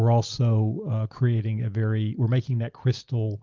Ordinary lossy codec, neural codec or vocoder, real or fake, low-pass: Opus, 24 kbps; none; real; 7.2 kHz